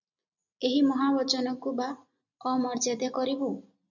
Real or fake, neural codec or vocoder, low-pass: real; none; 7.2 kHz